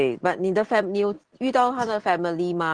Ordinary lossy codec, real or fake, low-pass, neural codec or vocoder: Opus, 16 kbps; real; 9.9 kHz; none